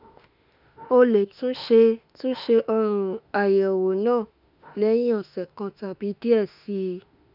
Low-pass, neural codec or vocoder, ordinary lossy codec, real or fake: 5.4 kHz; autoencoder, 48 kHz, 32 numbers a frame, DAC-VAE, trained on Japanese speech; none; fake